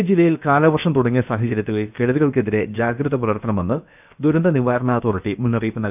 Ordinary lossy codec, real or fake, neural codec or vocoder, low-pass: none; fake; codec, 16 kHz, about 1 kbps, DyCAST, with the encoder's durations; 3.6 kHz